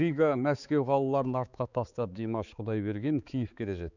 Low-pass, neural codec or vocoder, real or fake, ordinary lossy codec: 7.2 kHz; codec, 16 kHz, 4 kbps, X-Codec, HuBERT features, trained on balanced general audio; fake; none